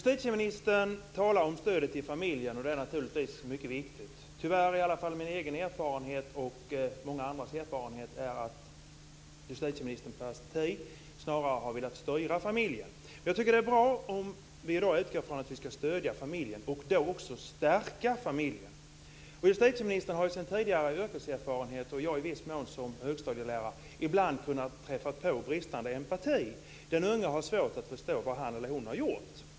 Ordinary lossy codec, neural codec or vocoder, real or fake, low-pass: none; none; real; none